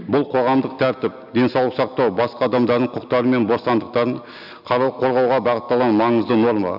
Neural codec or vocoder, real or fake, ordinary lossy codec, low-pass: none; real; none; 5.4 kHz